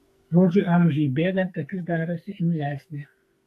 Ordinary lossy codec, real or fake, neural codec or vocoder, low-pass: AAC, 64 kbps; fake; codec, 44.1 kHz, 2.6 kbps, SNAC; 14.4 kHz